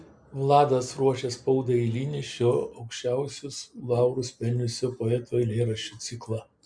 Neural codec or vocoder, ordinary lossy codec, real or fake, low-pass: none; MP3, 96 kbps; real; 9.9 kHz